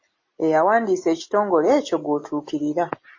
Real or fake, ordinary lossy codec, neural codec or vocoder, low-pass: real; MP3, 32 kbps; none; 7.2 kHz